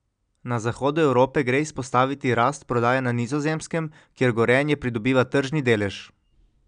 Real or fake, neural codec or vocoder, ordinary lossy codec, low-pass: real; none; none; 9.9 kHz